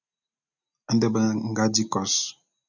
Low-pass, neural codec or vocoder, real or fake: 7.2 kHz; none; real